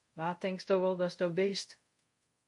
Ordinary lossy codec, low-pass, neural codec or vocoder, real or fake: MP3, 48 kbps; 10.8 kHz; codec, 24 kHz, 0.5 kbps, DualCodec; fake